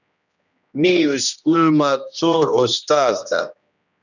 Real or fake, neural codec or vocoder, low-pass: fake; codec, 16 kHz, 1 kbps, X-Codec, HuBERT features, trained on general audio; 7.2 kHz